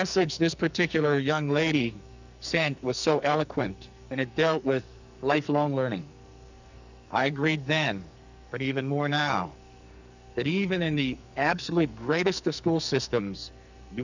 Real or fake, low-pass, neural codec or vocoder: fake; 7.2 kHz; codec, 44.1 kHz, 2.6 kbps, SNAC